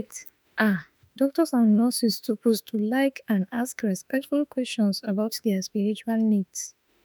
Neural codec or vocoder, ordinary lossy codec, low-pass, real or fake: autoencoder, 48 kHz, 32 numbers a frame, DAC-VAE, trained on Japanese speech; none; none; fake